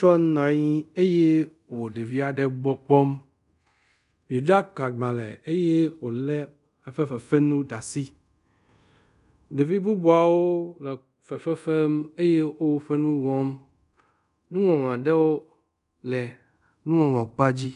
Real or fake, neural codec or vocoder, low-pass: fake; codec, 24 kHz, 0.5 kbps, DualCodec; 10.8 kHz